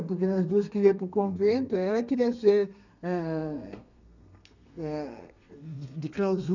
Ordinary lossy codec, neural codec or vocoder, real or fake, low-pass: none; codec, 32 kHz, 1.9 kbps, SNAC; fake; 7.2 kHz